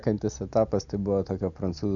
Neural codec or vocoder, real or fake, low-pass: none; real; 7.2 kHz